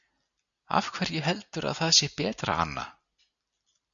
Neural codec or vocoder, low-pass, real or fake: none; 7.2 kHz; real